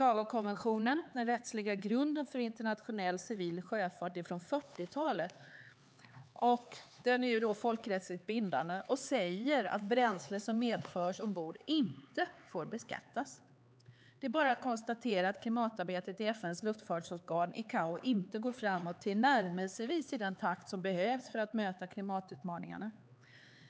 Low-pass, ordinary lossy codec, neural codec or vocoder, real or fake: none; none; codec, 16 kHz, 4 kbps, X-Codec, HuBERT features, trained on LibriSpeech; fake